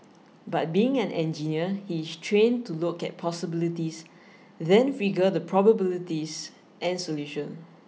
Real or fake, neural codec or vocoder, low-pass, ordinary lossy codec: real; none; none; none